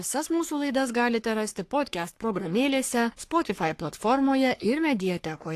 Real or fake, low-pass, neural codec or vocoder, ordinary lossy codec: fake; 14.4 kHz; codec, 44.1 kHz, 3.4 kbps, Pupu-Codec; AAC, 64 kbps